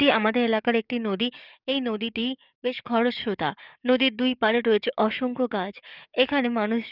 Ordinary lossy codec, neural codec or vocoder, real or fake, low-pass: none; codec, 44.1 kHz, 7.8 kbps, DAC; fake; 5.4 kHz